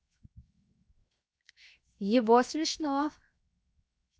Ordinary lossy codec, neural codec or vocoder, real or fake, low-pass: none; codec, 16 kHz, 0.7 kbps, FocalCodec; fake; none